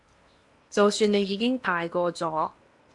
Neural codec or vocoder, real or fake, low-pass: codec, 16 kHz in and 24 kHz out, 0.8 kbps, FocalCodec, streaming, 65536 codes; fake; 10.8 kHz